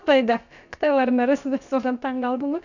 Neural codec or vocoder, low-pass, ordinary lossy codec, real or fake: codec, 16 kHz, about 1 kbps, DyCAST, with the encoder's durations; 7.2 kHz; none; fake